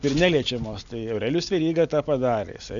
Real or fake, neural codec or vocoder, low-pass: real; none; 7.2 kHz